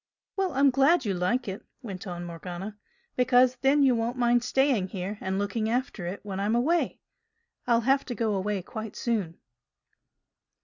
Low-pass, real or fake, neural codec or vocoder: 7.2 kHz; real; none